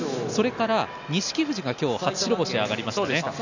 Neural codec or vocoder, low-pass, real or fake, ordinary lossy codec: none; 7.2 kHz; real; none